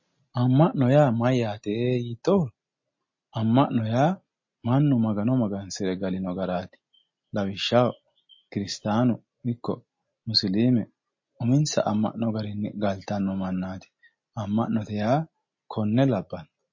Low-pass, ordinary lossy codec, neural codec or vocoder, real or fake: 7.2 kHz; MP3, 32 kbps; none; real